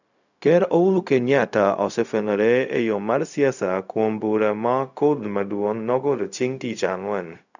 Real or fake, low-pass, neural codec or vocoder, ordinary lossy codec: fake; 7.2 kHz; codec, 16 kHz, 0.4 kbps, LongCat-Audio-Codec; none